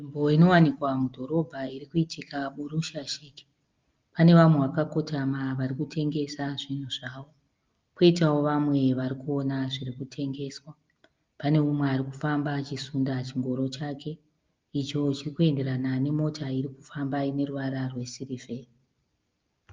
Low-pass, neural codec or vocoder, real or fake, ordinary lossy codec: 7.2 kHz; none; real; Opus, 24 kbps